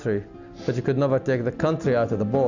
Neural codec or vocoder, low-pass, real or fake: none; 7.2 kHz; real